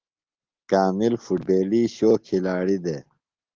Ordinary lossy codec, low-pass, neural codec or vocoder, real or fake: Opus, 16 kbps; 7.2 kHz; none; real